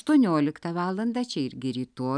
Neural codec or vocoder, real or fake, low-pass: none; real; 9.9 kHz